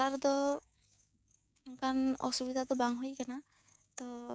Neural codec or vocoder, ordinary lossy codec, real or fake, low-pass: codec, 16 kHz, 6 kbps, DAC; none; fake; none